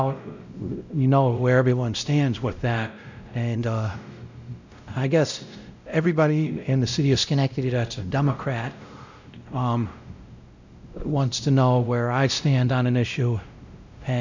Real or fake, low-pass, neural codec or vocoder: fake; 7.2 kHz; codec, 16 kHz, 0.5 kbps, X-Codec, WavLM features, trained on Multilingual LibriSpeech